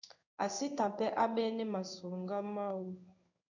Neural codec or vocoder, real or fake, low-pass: codec, 16 kHz in and 24 kHz out, 1 kbps, XY-Tokenizer; fake; 7.2 kHz